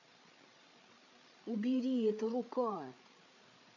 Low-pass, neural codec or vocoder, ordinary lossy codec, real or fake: 7.2 kHz; codec, 16 kHz, 8 kbps, FreqCodec, larger model; none; fake